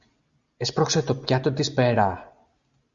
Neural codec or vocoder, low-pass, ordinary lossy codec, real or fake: none; 7.2 kHz; Opus, 64 kbps; real